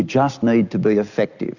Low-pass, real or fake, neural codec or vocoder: 7.2 kHz; real; none